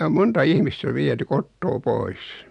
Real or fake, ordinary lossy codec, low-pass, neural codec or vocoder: real; none; 10.8 kHz; none